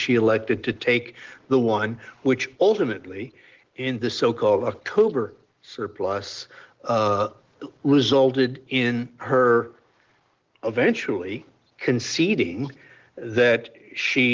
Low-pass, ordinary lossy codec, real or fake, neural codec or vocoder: 7.2 kHz; Opus, 16 kbps; real; none